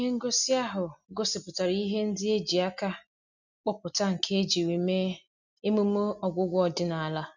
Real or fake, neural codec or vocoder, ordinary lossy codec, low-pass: real; none; none; 7.2 kHz